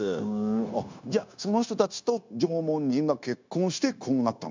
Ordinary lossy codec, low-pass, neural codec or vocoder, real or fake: MP3, 64 kbps; 7.2 kHz; codec, 16 kHz, 0.9 kbps, LongCat-Audio-Codec; fake